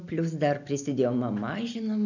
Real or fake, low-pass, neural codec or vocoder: real; 7.2 kHz; none